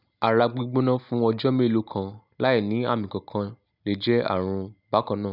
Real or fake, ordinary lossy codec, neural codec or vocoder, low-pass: real; none; none; 5.4 kHz